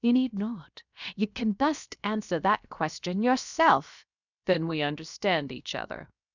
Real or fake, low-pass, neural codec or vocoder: fake; 7.2 kHz; codec, 16 kHz, 0.7 kbps, FocalCodec